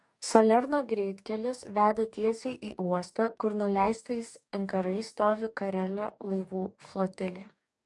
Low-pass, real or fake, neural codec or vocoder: 10.8 kHz; fake; codec, 44.1 kHz, 2.6 kbps, DAC